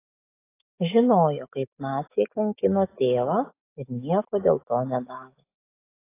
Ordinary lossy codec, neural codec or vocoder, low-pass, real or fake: AAC, 24 kbps; autoencoder, 48 kHz, 128 numbers a frame, DAC-VAE, trained on Japanese speech; 3.6 kHz; fake